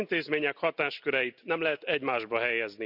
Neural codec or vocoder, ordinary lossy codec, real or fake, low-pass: none; none; real; 5.4 kHz